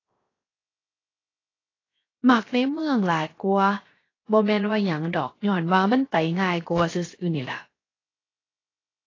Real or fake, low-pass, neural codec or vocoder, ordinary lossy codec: fake; 7.2 kHz; codec, 16 kHz, 0.7 kbps, FocalCodec; AAC, 32 kbps